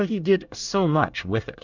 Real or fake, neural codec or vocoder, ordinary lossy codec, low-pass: fake; codec, 24 kHz, 1 kbps, SNAC; Opus, 64 kbps; 7.2 kHz